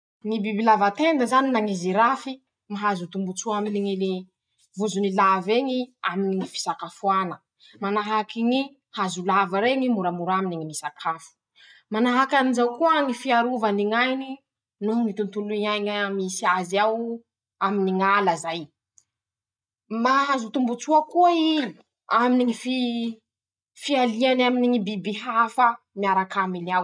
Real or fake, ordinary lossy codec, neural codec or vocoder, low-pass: real; none; none; 9.9 kHz